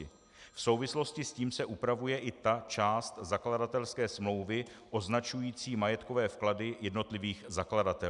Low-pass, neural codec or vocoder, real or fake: 10.8 kHz; none; real